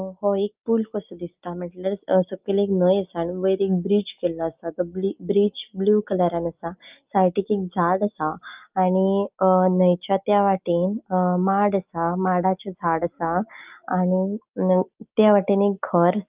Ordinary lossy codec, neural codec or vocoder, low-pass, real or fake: Opus, 24 kbps; none; 3.6 kHz; real